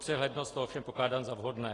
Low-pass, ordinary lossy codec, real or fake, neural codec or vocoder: 10.8 kHz; AAC, 32 kbps; real; none